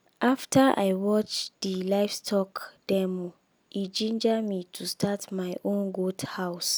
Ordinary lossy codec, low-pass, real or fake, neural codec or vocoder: none; none; real; none